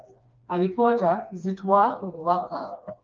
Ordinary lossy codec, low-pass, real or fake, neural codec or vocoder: Opus, 24 kbps; 7.2 kHz; fake; codec, 16 kHz, 2 kbps, FreqCodec, smaller model